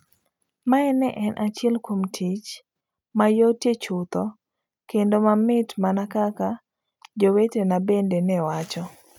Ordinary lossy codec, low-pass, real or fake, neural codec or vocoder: none; 19.8 kHz; real; none